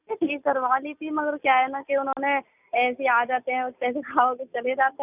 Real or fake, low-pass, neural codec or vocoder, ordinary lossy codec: real; 3.6 kHz; none; none